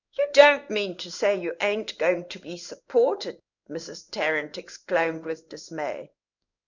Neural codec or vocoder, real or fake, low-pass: codec, 16 kHz in and 24 kHz out, 1 kbps, XY-Tokenizer; fake; 7.2 kHz